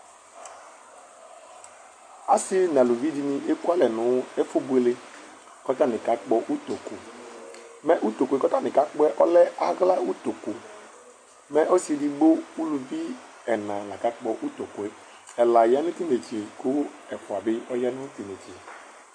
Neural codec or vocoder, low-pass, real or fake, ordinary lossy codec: none; 9.9 kHz; real; MP3, 48 kbps